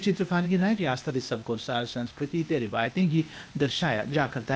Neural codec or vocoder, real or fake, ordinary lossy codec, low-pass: codec, 16 kHz, 0.8 kbps, ZipCodec; fake; none; none